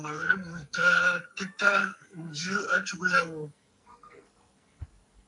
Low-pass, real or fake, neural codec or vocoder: 10.8 kHz; fake; codec, 44.1 kHz, 2.6 kbps, SNAC